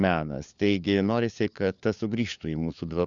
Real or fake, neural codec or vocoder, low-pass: fake; codec, 16 kHz, 4 kbps, FunCodec, trained on LibriTTS, 50 frames a second; 7.2 kHz